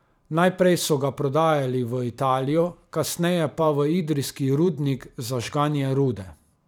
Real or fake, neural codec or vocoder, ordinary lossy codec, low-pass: fake; vocoder, 44.1 kHz, 128 mel bands every 512 samples, BigVGAN v2; none; 19.8 kHz